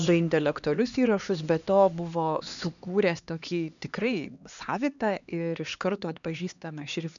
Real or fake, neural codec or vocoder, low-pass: fake; codec, 16 kHz, 2 kbps, X-Codec, HuBERT features, trained on LibriSpeech; 7.2 kHz